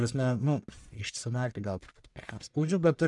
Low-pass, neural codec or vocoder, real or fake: 10.8 kHz; codec, 44.1 kHz, 1.7 kbps, Pupu-Codec; fake